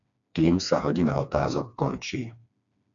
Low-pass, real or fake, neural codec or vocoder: 7.2 kHz; fake; codec, 16 kHz, 2 kbps, FreqCodec, smaller model